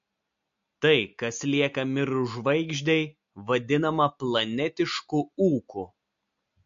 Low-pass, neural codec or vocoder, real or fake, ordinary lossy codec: 7.2 kHz; none; real; MP3, 48 kbps